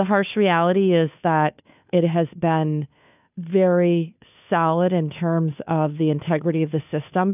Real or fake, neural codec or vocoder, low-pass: fake; codec, 16 kHz, 2 kbps, FunCodec, trained on Chinese and English, 25 frames a second; 3.6 kHz